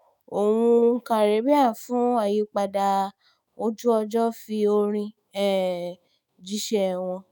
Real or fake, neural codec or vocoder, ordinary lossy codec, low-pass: fake; autoencoder, 48 kHz, 128 numbers a frame, DAC-VAE, trained on Japanese speech; none; none